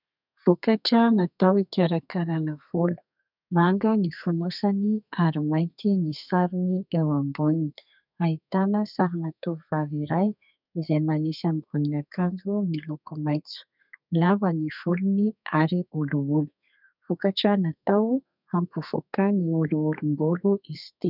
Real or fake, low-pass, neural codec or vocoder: fake; 5.4 kHz; codec, 32 kHz, 1.9 kbps, SNAC